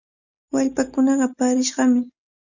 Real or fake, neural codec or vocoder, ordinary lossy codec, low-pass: real; none; Opus, 64 kbps; 7.2 kHz